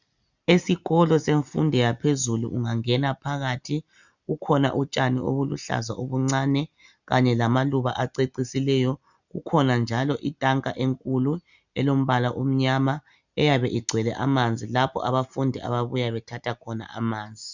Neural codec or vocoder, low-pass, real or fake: none; 7.2 kHz; real